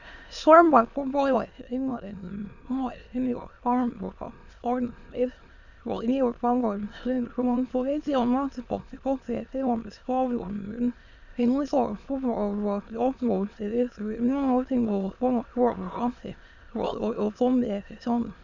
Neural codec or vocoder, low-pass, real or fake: autoencoder, 22.05 kHz, a latent of 192 numbers a frame, VITS, trained on many speakers; 7.2 kHz; fake